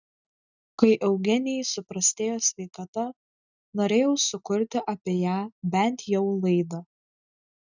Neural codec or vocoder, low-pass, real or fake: none; 7.2 kHz; real